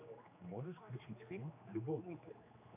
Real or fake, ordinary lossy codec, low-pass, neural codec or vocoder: fake; AAC, 32 kbps; 3.6 kHz; codec, 16 kHz, 2 kbps, X-Codec, HuBERT features, trained on general audio